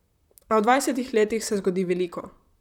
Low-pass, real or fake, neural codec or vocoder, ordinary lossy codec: 19.8 kHz; fake; vocoder, 44.1 kHz, 128 mel bands, Pupu-Vocoder; none